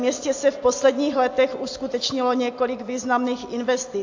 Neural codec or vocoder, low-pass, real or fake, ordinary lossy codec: none; 7.2 kHz; real; AAC, 48 kbps